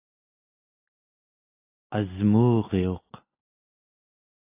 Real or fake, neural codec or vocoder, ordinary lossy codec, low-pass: real; none; AAC, 24 kbps; 3.6 kHz